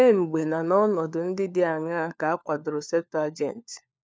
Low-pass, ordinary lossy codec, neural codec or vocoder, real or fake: none; none; codec, 16 kHz, 4 kbps, FunCodec, trained on LibriTTS, 50 frames a second; fake